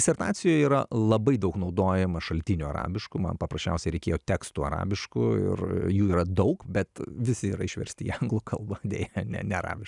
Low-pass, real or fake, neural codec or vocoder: 10.8 kHz; real; none